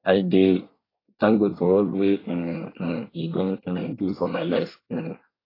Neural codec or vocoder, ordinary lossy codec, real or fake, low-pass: codec, 24 kHz, 1 kbps, SNAC; AAC, 24 kbps; fake; 5.4 kHz